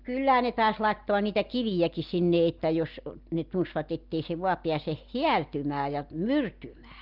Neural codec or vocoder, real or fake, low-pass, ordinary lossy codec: none; real; 5.4 kHz; Opus, 32 kbps